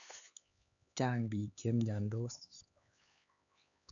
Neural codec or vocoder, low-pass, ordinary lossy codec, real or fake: codec, 16 kHz, 4 kbps, X-Codec, HuBERT features, trained on LibriSpeech; 7.2 kHz; none; fake